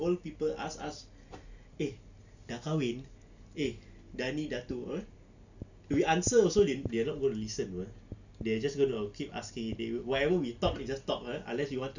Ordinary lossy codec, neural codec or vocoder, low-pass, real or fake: none; none; 7.2 kHz; real